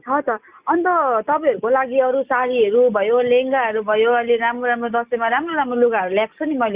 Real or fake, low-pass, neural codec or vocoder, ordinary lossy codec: real; 3.6 kHz; none; Opus, 64 kbps